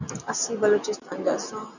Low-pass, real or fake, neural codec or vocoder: 7.2 kHz; real; none